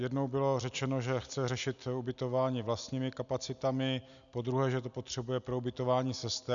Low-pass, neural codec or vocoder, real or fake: 7.2 kHz; none; real